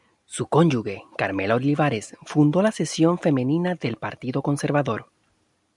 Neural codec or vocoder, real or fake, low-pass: none; real; 10.8 kHz